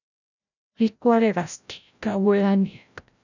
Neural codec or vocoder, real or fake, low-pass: codec, 16 kHz, 0.5 kbps, FreqCodec, larger model; fake; 7.2 kHz